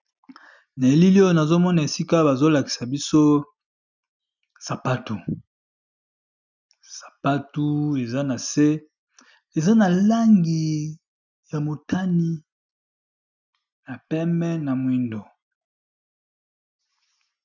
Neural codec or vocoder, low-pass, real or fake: none; 7.2 kHz; real